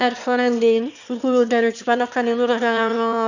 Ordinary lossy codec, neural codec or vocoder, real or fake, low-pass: none; autoencoder, 22.05 kHz, a latent of 192 numbers a frame, VITS, trained on one speaker; fake; 7.2 kHz